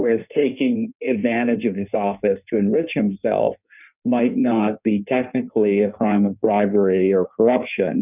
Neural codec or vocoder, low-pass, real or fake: codec, 16 kHz in and 24 kHz out, 1.1 kbps, FireRedTTS-2 codec; 3.6 kHz; fake